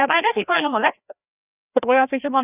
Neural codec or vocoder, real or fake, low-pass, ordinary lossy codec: codec, 16 kHz, 1 kbps, FreqCodec, larger model; fake; 3.6 kHz; none